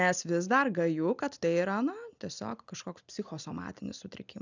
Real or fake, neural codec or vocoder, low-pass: real; none; 7.2 kHz